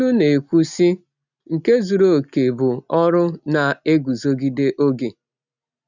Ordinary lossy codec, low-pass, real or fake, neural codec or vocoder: none; 7.2 kHz; real; none